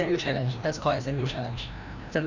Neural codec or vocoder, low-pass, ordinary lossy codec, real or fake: codec, 16 kHz, 1 kbps, FreqCodec, larger model; 7.2 kHz; none; fake